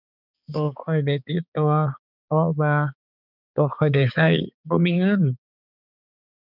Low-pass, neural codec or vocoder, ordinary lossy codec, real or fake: 5.4 kHz; codec, 16 kHz, 4 kbps, X-Codec, HuBERT features, trained on balanced general audio; none; fake